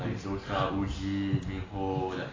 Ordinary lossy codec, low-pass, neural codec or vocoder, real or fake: AAC, 32 kbps; 7.2 kHz; none; real